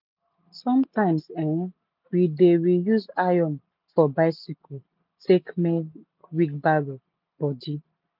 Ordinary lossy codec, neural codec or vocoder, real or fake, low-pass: none; none; real; 5.4 kHz